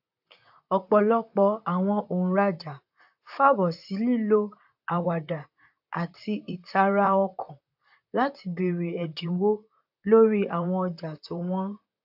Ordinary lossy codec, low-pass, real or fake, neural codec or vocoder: AAC, 48 kbps; 5.4 kHz; fake; vocoder, 44.1 kHz, 128 mel bands, Pupu-Vocoder